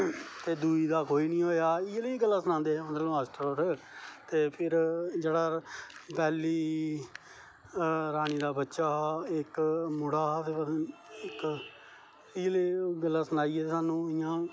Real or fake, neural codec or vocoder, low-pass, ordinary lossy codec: real; none; none; none